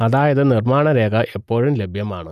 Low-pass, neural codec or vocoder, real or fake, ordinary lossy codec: 14.4 kHz; none; real; none